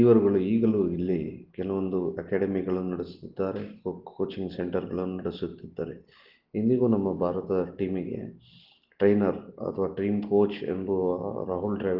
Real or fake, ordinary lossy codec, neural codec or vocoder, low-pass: real; Opus, 16 kbps; none; 5.4 kHz